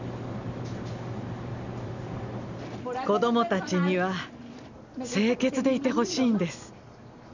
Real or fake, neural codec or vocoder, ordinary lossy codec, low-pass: real; none; none; 7.2 kHz